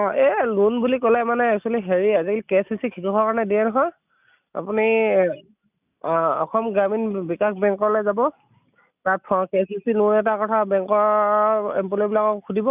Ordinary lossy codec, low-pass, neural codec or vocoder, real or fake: none; 3.6 kHz; none; real